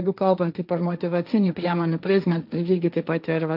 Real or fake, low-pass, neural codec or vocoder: fake; 5.4 kHz; codec, 16 kHz, 1.1 kbps, Voila-Tokenizer